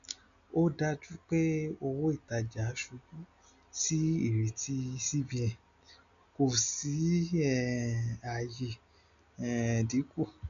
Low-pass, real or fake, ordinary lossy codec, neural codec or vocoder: 7.2 kHz; real; none; none